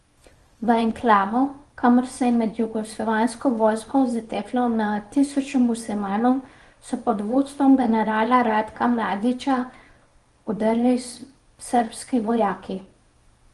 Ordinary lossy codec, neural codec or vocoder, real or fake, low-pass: Opus, 24 kbps; codec, 24 kHz, 0.9 kbps, WavTokenizer, small release; fake; 10.8 kHz